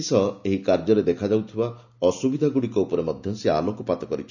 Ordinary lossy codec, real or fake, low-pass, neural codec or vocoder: none; real; 7.2 kHz; none